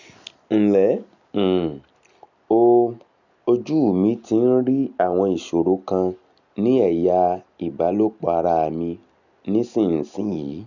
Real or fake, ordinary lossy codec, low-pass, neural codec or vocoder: real; none; 7.2 kHz; none